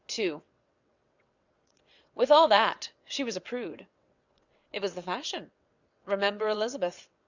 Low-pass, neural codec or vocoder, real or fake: 7.2 kHz; vocoder, 22.05 kHz, 80 mel bands, WaveNeXt; fake